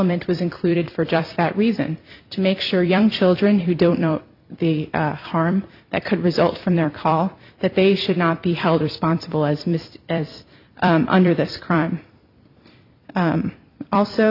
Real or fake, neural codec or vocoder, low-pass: fake; vocoder, 44.1 kHz, 128 mel bands every 256 samples, BigVGAN v2; 5.4 kHz